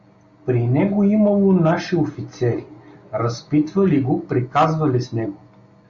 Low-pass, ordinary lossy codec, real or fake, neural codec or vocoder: 7.2 kHz; Opus, 64 kbps; real; none